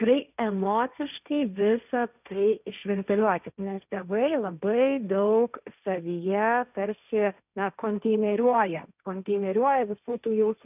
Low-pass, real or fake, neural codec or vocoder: 3.6 kHz; fake; codec, 16 kHz, 1.1 kbps, Voila-Tokenizer